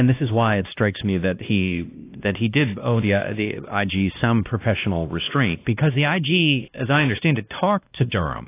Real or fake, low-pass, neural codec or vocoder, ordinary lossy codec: fake; 3.6 kHz; codec, 16 kHz, 1 kbps, X-Codec, WavLM features, trained on Multilingual LibriSpeech; AAC, 24 kbps